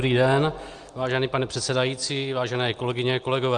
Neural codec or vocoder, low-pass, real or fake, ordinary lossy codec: none; 9.9 kHz; real; Opus, 32 kbps